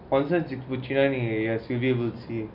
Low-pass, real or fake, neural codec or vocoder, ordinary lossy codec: 5.4 kHz; real; none; none